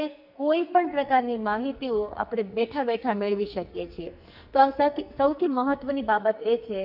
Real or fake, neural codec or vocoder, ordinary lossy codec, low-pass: fake; codec, 44.1 kHz, 2.6 kbps, SNAC; none; 5.4 kHz